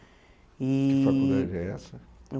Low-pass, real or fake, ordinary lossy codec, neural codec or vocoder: none; real; none; none